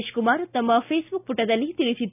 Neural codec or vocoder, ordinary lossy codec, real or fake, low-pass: none; none; real; 3.6 kHz